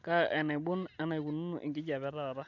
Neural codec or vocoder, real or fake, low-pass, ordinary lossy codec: none; real; 7.2 kHz; none